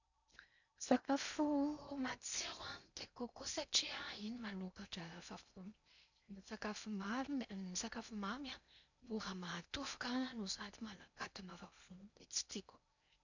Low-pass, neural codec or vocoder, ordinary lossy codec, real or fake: 7.2 kHz; codec, 16 kHz in and 24 kHz out, 0.8 kbps, FocalCodec, streaming, 65536 codes; none; fake